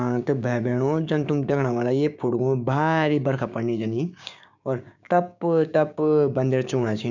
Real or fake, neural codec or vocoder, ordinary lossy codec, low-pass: fake; codec, 16 kHz, 6 kbps, DAC; none; 7.2 kHz